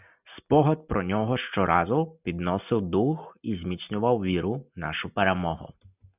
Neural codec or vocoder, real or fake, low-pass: none; real; 3.6 kHz